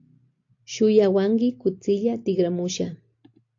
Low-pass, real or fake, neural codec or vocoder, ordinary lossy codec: 7.2 kHz; real; none; AAC, 48 kbps